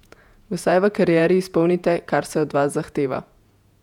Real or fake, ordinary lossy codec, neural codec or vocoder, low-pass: fake; none; vocoder, 48 kHz, 128 mel bands, Vocos; 19.8 kHz